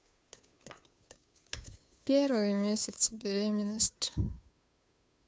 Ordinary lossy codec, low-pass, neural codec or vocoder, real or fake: none; none; codec, 16 kHz, 2 kbps, FunCodec, trained on Chinese and English, 25 frames a second; fake